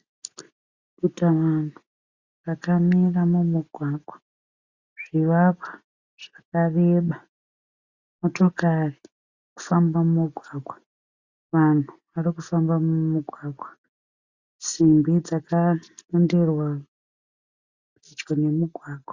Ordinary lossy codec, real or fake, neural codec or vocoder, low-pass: Opus, 64 kbps; real; none; 7.2 kHz